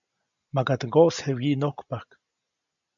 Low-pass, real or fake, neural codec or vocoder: 7.2 kHz; real; none